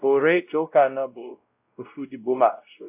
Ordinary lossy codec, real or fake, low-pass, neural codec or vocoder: AAC, 32 kbps; fake; 3.6 kHz; codec, 16 kHz, 0.5 kbps, X-Codec, WavLM features, trained on Multilingual LibriSpeech